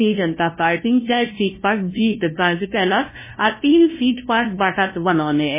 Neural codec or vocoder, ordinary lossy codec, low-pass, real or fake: codec, 16 kHz, 0.5 kbps, FunCodec, trained on LibriTTS, 25 frames a second; MP3, 16 kbps; 3.6 kHz; fake